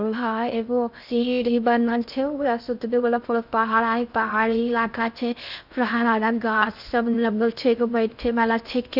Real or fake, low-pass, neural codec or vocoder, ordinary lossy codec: fake; 5.4 kHz; codec, 16 kHz in and 24 kHz out, 0.6 kbps, FocalCodec, streaming, 2048 codes; none